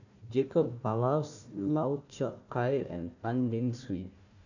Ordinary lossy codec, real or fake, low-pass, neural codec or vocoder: none; fake; 7.2 kHz; codec, 16 kHz, 1 kbps, FunCodec, trained on Chinese and English, 50 frames a second